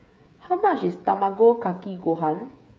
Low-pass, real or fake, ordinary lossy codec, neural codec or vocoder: none; fake; none; codec, 16 kHz, 8 kbps, FreqCodec, smaller model